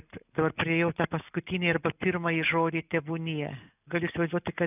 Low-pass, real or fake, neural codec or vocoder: 3.6 kHz; real; none